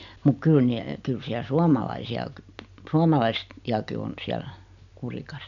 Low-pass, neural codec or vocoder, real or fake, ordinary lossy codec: 7.2 kHz; none; real; none